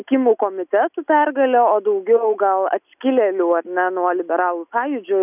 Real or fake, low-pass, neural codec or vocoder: real; 3.6 kHz; none